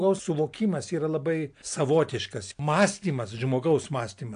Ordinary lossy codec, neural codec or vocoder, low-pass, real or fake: AAC, 64 kbps; none; 9.9 kHz; real